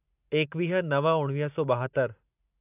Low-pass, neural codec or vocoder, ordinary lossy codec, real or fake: 3.6 kHz; vocoder, 44.1 kHz, 128 mel bands every 512 samples, BigVGAN v2; AAC, 32 kbps; fake